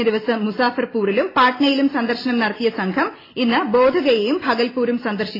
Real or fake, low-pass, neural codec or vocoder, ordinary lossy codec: real; 5.4 kHz; none; AAC, 24 kbps